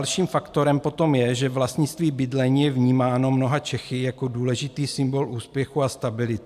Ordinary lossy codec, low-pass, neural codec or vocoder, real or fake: AAC, 96 kbps; 14.4 kHz; none; real